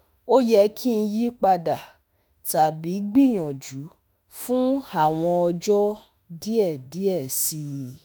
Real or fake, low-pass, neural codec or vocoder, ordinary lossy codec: fake; none; autoencoder, 48 kHz, 32 numbers a frame, DAC-VAE, trained on Japanese speech; none